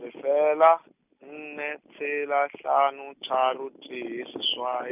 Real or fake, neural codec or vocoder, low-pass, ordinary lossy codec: real; none; 3.6 kHz; none